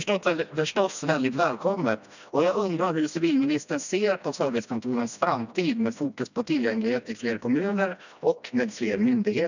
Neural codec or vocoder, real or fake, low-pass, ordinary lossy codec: codec, 16 kHz, 1 kbps, FreqCodec, smaller model; fake; 7.2 kHz; none